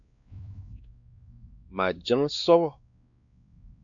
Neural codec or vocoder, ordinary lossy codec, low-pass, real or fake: codec, 16 kHz, 2 kbps, X-Codec, WavLM features, trained on Multilingual LibriSpeech; AAC, 64 kbps; 7.2 kHz; fake